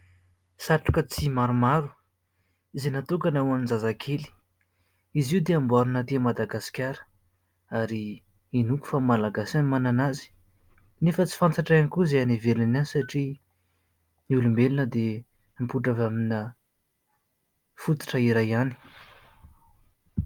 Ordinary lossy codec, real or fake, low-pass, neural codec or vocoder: Opus, 32 kbps; real; 19.8 kHz; none